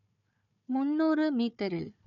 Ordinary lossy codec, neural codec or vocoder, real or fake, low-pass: none; codec, 16 kHz, 4 kbps, FunCodec, trained on Chinese and English, 50 frames a second; fake; 7.2 kHz